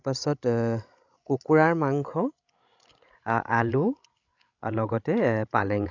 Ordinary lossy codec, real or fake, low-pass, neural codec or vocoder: none; fake; 7.2 kHz; codec, 16 kHz, 16 kbps, FreqCodec, larger model